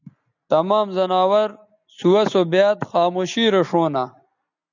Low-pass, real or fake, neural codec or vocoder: 7.2 kHz; real; none